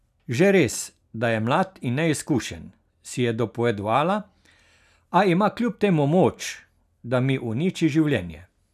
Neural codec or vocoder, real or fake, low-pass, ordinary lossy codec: none; real; 14.4 kHz; none